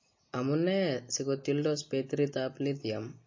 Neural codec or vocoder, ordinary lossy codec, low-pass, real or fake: none; MP3, 32 kbps; 7.2 kHz; real